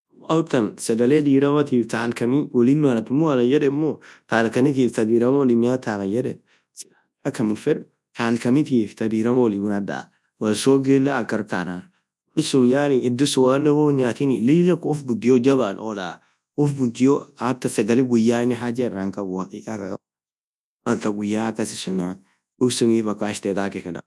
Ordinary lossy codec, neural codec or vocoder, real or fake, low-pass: none; codec, 24 kHz, 0.9 kbps, WavTokenizer, large speech release; fake; none